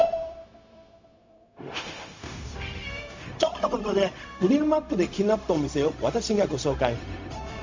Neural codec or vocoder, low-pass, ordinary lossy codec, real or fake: codec, 16 kHz, 0.4 kbps, LongCat-Audio-Codec; 7.2 kHz; MP3, 48 kbps; fake